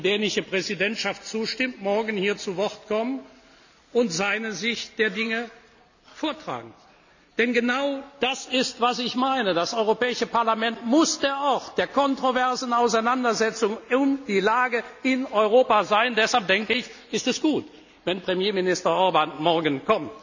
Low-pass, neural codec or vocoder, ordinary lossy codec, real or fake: 7.2 kHz; none; none; real